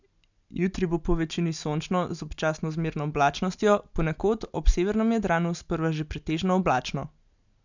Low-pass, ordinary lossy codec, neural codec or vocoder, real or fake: 7.2 kHz; none; none; real